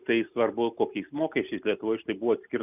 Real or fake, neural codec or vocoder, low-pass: real; none; 3.6 kHz